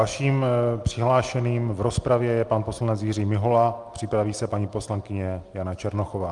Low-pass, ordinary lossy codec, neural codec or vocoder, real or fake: 10.8 kHz; Opus, 32 kbps; none; real